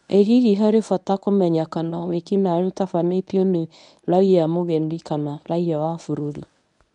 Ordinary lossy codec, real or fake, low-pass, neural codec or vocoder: none; fake; 10.8 kHz; codec, 24 kHz, 0.9 kbps, WavTokenizer, medium speech release version 1